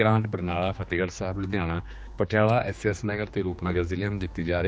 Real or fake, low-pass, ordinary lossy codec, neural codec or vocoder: fake; none; none; codec, 16 kHz, 2 kbps, X-Codec, HuBERT features, trained on general audio